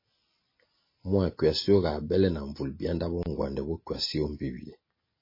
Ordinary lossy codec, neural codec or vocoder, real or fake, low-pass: MP3, 24 kbps; none; real; 5.4 kHz